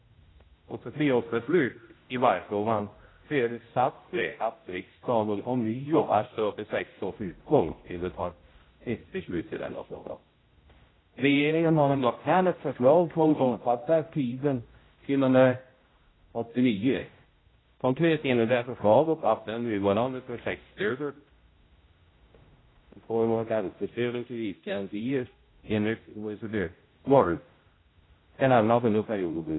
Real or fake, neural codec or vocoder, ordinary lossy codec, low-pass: fake; codec, 16 kHz, 0.5 kbps, X-Codec, HuBERT features, trained on general audio; AAC, 16 kbps; 7.2 kHz